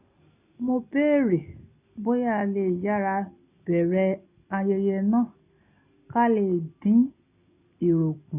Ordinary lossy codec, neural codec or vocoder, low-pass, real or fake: none; none; 3.6 kHz; real